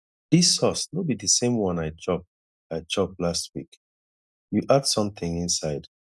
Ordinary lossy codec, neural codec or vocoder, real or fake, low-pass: none; none; real; none